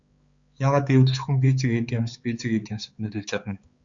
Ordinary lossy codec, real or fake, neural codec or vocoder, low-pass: Opus, 64 kbps; fake; codec, 16 kHz, 4 kbps, X-Codec, HuBERT features, trained on balanced general audio; 7.2 kHz